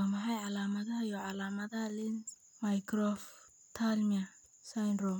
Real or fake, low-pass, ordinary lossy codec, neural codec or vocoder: real; 19.8 kHz; none; none